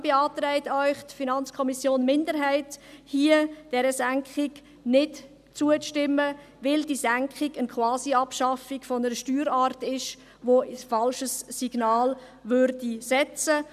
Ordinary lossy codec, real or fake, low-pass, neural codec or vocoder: none; real; 14.4 kHz; none